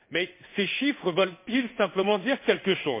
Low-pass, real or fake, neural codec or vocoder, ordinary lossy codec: 3.6 kHz; fake; codec, 16 kHz in and 24 kHz out, 1 kbps, XY-Tokenizer; MP3, 24 kbps